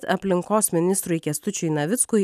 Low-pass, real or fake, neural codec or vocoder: 14.4 kHz; real; none